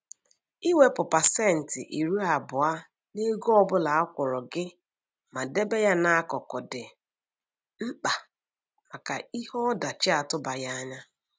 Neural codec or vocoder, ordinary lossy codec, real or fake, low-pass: none; none; real; none